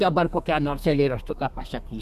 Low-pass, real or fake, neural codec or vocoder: 14.4 kHz; fake; codec, 32 kHz, 1.9 kbps, SNAC